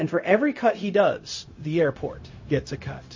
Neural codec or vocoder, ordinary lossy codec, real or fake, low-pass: codec, 16 kHz, 0.4 kbps, LongCat-Audio-Codec; MP3, 32 kbps; fake; 7.2 kHz